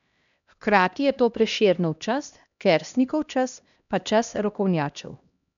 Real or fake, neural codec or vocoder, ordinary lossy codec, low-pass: fake; codec, 16 kHz, 1 kbps, X-Codec, HuBERT features, trained on LibriSpeech; none; 7.2 kHz